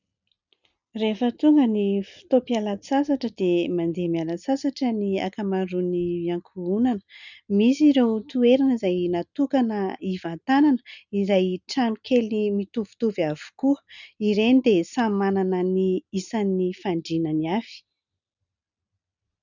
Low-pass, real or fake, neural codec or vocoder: 7.2 kHz; real; none